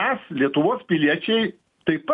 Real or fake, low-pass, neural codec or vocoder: real; 10.8 kHz; none